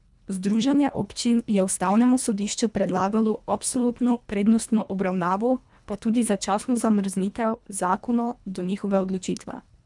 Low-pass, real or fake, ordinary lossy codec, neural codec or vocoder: 10.8 kHz; fake; none; codec, 24 kHz, 1.5 kbps, HILCodec